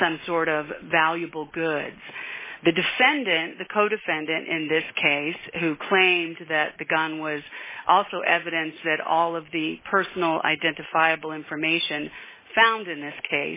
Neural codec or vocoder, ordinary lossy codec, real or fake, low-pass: none; MP3, 16 kbps; real; 3.6 kHz